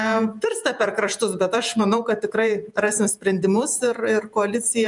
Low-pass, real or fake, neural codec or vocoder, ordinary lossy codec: 10.8 kHz; fake; vocoder, 48 kHz, 128 mel bands, Vocos; MP3, 96 kbps